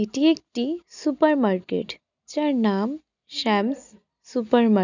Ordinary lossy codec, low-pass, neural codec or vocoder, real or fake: none; 7.2 kHz; none; real